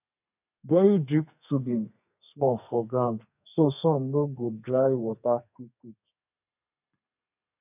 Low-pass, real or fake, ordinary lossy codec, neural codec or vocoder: 3.6 kHz; fake; none; codec, 32 kHz, 1.9 kbps, SNAC